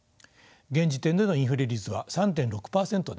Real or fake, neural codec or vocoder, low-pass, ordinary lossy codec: real; none; none; none